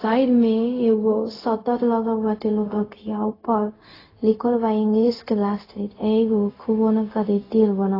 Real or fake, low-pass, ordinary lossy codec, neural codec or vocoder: fake; 5.4 kHz; AAC, 24 kbps; codec, 16 kHz, 0.4 kbps, LongCat-Audio-Codec